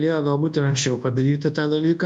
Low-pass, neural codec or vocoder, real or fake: 9.9 kHz; codec, 24 kHz, 0.9 kbps, WavTokenizer, large speech release; fake